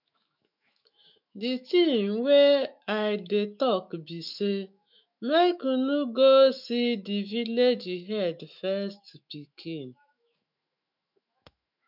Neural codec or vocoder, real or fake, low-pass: autoencoder, 48 kHz, 128 numbers a frame, DAC-VAE, trained on Japanese speech; fake; 5.4 kHz